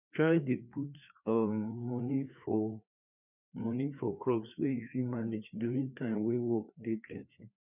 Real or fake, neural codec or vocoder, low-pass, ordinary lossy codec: fake; codec, 16 kHz, 2 kbps, FreqCodec, larger model; 3.6 kHz; none